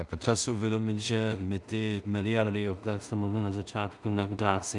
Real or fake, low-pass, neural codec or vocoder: fake; 10.8 kHz; codec, 16 kHz in and 24 kHz out, 0.4 kbps, LongCat-Audio-Codec, two codebook decoder